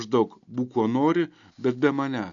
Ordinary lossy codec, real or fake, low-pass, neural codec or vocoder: MP3, 96 kbps; real; 7.2 kHz; none